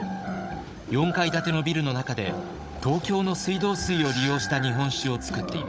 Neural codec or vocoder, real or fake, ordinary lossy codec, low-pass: codec, 16 kHz, 16 kbps, FunCodec, trained on Chinese and English, 50 frames a second; fake; none; none